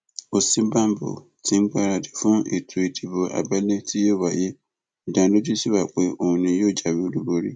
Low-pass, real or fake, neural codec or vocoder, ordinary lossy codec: none; real; none; none